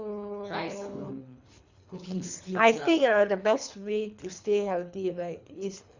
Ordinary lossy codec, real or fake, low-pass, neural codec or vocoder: Opus, 64 kbps; fake; 7.2 kHz; codec, 24 kHz, 3 kbps, HILCodec